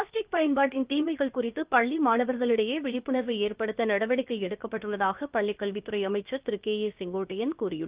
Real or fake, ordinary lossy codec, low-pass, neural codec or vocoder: fake; Opus, 24 kbps; 3.6 kHz; codec, 16 kHz, about 1 kbps, DyCAST, with the encoder's durations